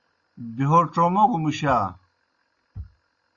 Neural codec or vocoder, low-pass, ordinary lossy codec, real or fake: none; 7.2 kHz; Opus, 64 kbps; real